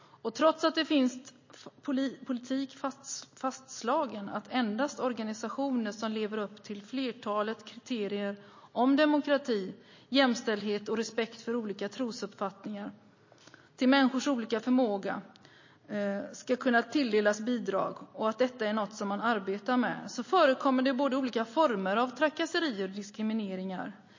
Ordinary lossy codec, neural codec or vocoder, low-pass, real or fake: MP3, 32 kbps; none; 7.2 kHz; real